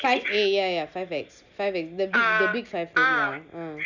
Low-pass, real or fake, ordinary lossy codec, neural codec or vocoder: 7.2 kHz; real; none; none